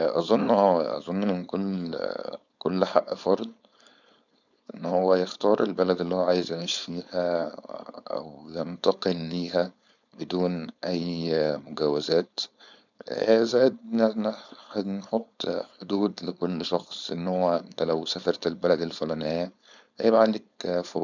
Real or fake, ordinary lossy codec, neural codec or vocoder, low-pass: fake; none; codec, 16 kHz, 4.8 kbps, FACodec; 7.2 kHz